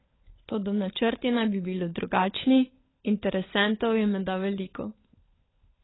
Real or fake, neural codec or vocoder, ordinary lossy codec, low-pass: fake; codec, 16 kHz, 16 kbps, FunCodec, trained on LibriTTS, 50 frames a second; AAC, 16 kbps; 7.2 kHz